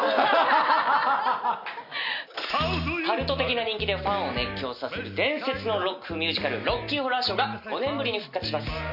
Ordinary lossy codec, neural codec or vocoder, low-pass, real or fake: none; none; 5.4 kHz; real